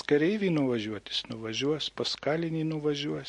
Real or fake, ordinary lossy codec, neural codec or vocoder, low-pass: real; MP3, 48 kbps; none; 10.8 kHz